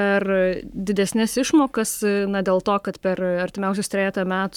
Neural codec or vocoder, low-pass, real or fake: codec, 44.1 kHz, 7.8 kbps, Pupu-Codec; 19.8 kHz; fake